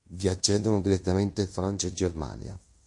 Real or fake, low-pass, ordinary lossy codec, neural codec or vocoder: fake; 10.8 kHz; MP3, 48 kbps; codec, 16 kHz in and 24 kHz out, 0.9 kbps, LongCat-Audio-Codec, fine tuned four codebook decoder